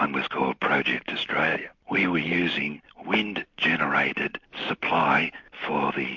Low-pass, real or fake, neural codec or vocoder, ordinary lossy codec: 7.2 kHz; real; none; MP3, 48 kbps